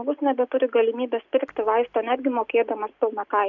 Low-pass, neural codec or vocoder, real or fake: 7.2 kHz; none; real